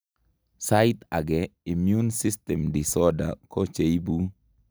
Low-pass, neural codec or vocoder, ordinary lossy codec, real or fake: none; none; none; real